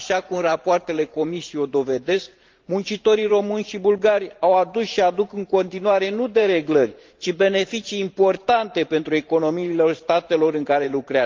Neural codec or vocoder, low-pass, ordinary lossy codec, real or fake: vocoder, 44.1 kHz, 128 mel bands every 512 samples, BigVGAN v2; 7.2 kHz; Opus, 24 kbps; fake